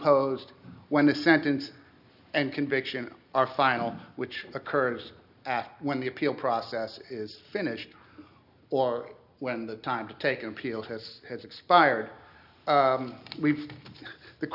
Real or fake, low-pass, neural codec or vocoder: real; 5.4 kHz; none